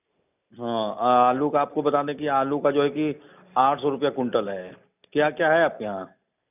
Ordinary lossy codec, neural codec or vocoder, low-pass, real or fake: none; none; 3.6 kHz; real